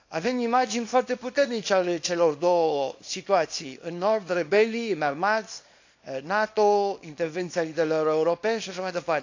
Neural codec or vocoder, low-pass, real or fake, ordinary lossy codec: codec, 24 kHz, 0.9 kbps, WavTokenizer, small release; 7.2 kHz; fake; AAC, 48 kbps